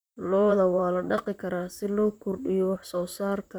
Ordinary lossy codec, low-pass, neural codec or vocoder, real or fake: none; none; vocoder, 44.1 kHz, 128 mel bands, Pupu-Vocoder; fake